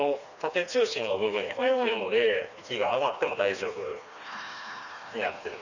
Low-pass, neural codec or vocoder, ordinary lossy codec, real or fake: 7.2 kHz; codec, 16 kHz, 2 kbps, FreqCodec, smaller model; none; fake